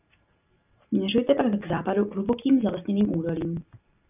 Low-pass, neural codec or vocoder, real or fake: 3.6 kHz; none; real